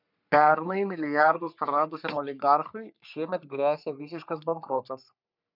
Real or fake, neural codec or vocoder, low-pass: fake; codec, 44.1 kHz, 3.4 kbps, Pupu-Codec; 5.4 kHz